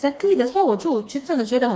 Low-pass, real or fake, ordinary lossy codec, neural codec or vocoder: none; fake; none; codec, 16 kHz, 2 kbps, FreqCodec, smaller model